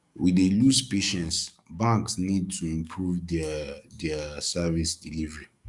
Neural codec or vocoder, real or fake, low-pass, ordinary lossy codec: codec, 44.1 kHz, 7.8 kbps, DAC; fake; 10.8 kHz; Opus, 64 kbps